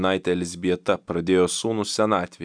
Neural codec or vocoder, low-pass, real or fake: none; 9.9 kHz; real